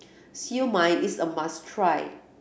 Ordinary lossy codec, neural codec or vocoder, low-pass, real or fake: none; none; none; real